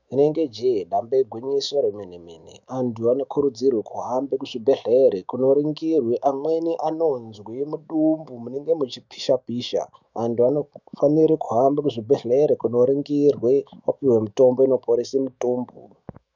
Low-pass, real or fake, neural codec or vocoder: 7.2 kHz; fake; autoencoder, 48 kHz, 128 numbers a frame, DAC-VAE, trained on Japanese speech